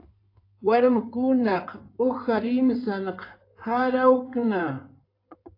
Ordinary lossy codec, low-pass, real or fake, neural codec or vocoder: AAC, 32 kbps; 5.4 kHz; fake; codec, 16 kHz in and 24 kHz out, 2.2 kbps, FireRedTTS-2 codec